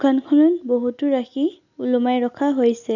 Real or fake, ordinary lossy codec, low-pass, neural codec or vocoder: real; none; 7.2 kHz; none